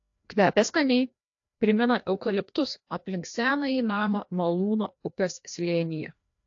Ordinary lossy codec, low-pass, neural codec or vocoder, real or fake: AAC, 48 kbps; 7.2 kHz; codec, 16 kHz, 1 kbps, FreqCodec, larger model; fake